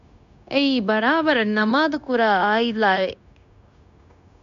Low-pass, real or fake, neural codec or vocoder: 7.2 kHz; fake; codec, 16 kHz, 0.9 kbps, LongCat-Audio-Codec